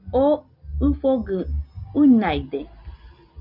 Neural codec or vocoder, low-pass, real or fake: none; 5.4 kHz; real